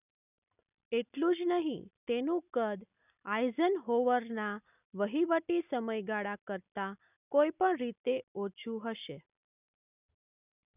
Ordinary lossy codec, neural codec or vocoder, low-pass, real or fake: none; none; 3.6 kHz; real